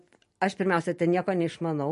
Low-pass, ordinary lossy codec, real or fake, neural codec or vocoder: 14.4 kHz; MP3, 48 kbps; real; none